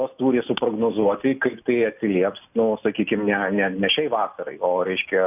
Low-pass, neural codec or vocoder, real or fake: 3.6 kHz; none; real